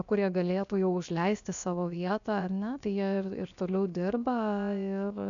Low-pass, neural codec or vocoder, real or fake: 7.2 kHz; codec, 16 kHz, 0.7 kbps, FocalCodec; fake